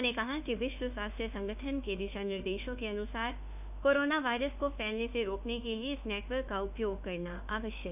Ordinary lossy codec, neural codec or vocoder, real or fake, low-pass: none; autoencoder, 48 kHz, 32 numbers a frame, DAC-VAE, trained on Japanese speech; fake; 3.6 kHz